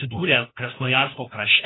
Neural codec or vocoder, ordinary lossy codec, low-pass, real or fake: codec, 16 kHz, 1 kbps, FunCodec, trained on LibriTTS, 50 frames a second; AAC, 16 kbps; 7.2 kHz; fake